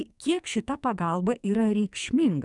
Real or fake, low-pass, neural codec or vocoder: fake; 10.8 kHz; codec, 44.1 kHz, 2.6 kbps, SNAC